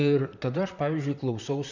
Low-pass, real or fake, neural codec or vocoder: 7.2 kHz; fake; vocoder, 44.1 kHz, 128 mel bands, Pupu-Vocoder